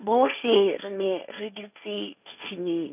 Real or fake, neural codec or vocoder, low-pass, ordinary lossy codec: fake; codec, 16 kHz, 1.1 kbps, Voila-Tokenizer; 3.6 kHz; none